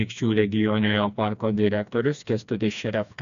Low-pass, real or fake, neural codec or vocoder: 7.2 kHz; fake; codec, 16 kHz, 2 kbps, FreqCodec, smaller model